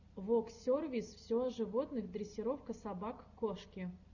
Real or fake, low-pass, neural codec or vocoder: real; 7.2 kHz; none